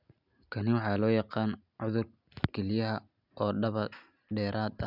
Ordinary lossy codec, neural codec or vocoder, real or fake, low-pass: none; none; real; 5.4 kHz